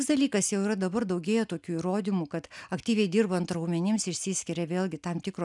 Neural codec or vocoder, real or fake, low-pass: none; real; 10.8 kHz